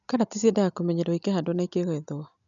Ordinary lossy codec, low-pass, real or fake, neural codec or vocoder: none; 7.2 kHz; real; none